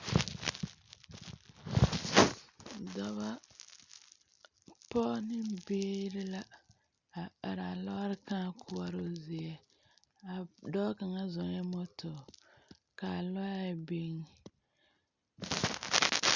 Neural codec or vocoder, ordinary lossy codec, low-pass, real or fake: none; Opus, 64 kbps; 7.2 kHz; real